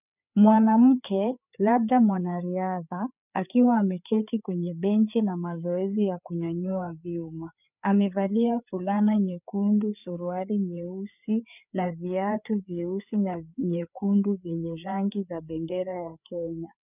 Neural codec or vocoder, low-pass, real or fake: codec, 16 kHz, 4 kbps, FreqCodec, larger model; 3.6 kHz; fake